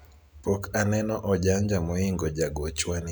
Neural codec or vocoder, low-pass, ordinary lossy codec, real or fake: none; none; none; real